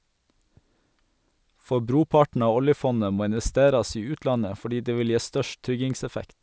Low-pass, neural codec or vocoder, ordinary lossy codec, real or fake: none; none; none; real